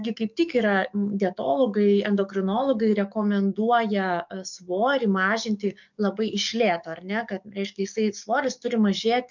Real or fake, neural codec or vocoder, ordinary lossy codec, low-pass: fake; codec, 44.1 kHz, 7.8 kbps, DAC; MP3, 64 kbps; 7.2 kHz